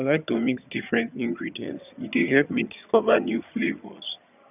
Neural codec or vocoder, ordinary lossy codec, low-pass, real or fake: vocoder, 22.05 kHz, 80 mel bands, HiFi-GAN; none; 3.6 kHz; fake